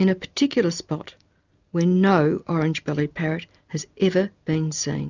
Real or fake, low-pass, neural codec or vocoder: real; 7.2 kHz; none